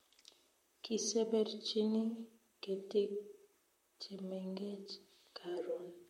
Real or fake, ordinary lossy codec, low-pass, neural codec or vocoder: fake; MP3, 64 kbps; 19.8 kHz; vocoder, 44.1 kHz, 128 mel bands, Pupu-Vocoder